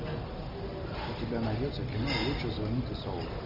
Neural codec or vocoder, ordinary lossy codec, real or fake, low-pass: none; MP3, 48 kbps; real; 5.4 kHz